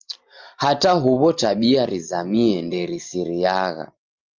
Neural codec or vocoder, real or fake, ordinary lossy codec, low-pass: none; real; Opus, 32 kbps; 7.2 kHz